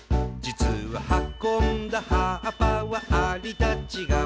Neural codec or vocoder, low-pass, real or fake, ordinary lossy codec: none; none; real; none